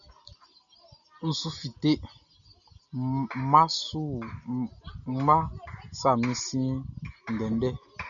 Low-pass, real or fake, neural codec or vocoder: 7.2 kHz; real; none